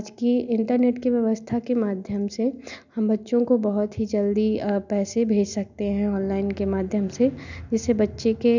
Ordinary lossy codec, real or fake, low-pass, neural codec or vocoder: none; real; 7.2 kHz; none